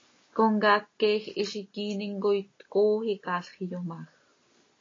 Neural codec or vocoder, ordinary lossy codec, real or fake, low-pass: none; AAC, 32 kbps; real; 7.2 kHz